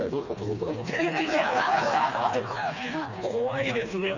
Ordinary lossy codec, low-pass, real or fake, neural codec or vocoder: none; 7.2 kHz; fake; codec, 16 kHz, 2 kbps, FreqCodec, smaller model